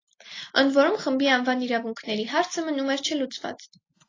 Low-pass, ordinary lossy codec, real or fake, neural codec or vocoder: 7.2 kHz; AAC, 32 kbps; real; none